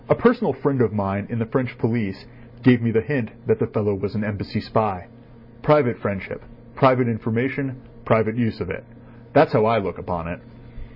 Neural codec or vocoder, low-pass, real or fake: none; 5.4 kHz; real